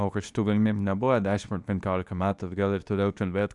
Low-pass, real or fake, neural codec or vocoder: 10.8 kHz; fake; codec, 24 kHz, 0.9 kbps, WavTokenizer, medium speech release version 2